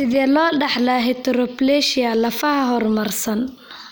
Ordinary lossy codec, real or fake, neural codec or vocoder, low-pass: none; real; none; none